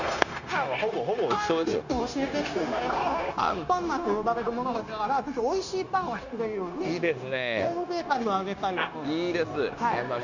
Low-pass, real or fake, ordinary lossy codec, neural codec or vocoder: 7.2 kHz; fake; none; codec, 16 kHz, 0.9 kbps, LongCat-Audio-Codec